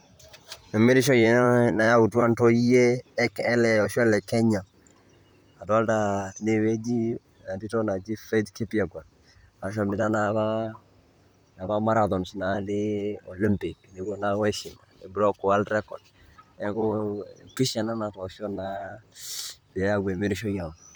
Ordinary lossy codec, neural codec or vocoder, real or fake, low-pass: none; vocoder, 44.1 kHz, 128 mel bands, Pupu-Vocoder; fake; none